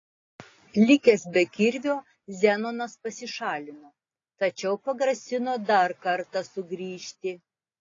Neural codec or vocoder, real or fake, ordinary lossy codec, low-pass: none; real; AAC, 32 kbps; 7.2 kHz